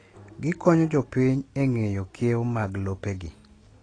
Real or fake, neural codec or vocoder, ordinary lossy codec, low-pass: real; none; AAC, 32 kbps; 9.9 kHz